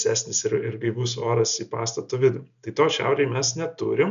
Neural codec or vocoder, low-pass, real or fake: none; 7.2 kHz; real